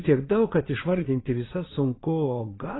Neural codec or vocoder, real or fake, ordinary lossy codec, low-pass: vocoder, 22.05 kHz, 80 mel bands, Vocos; fake; AAC, 16 kbps; 7.2 kHz